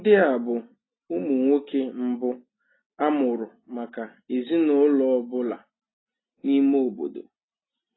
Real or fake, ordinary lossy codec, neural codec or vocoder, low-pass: real; AAC, 16 kbps; none; 7.2 kHz